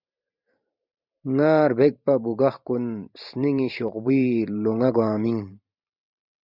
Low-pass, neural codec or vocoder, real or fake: 5.4 kHz; vocoder, 44.1 kHz, 128 mel bands every 512 samples, BigVGAN v2; fake